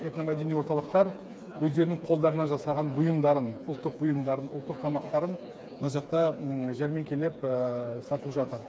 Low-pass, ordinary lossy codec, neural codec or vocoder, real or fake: none; none; codec, 16 kHz, 4 kbps, FreqCodec, smaller model; fake